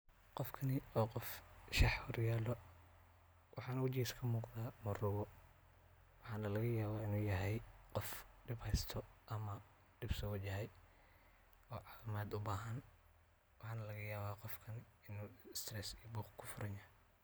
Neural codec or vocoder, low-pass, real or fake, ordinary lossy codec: none; none; real; none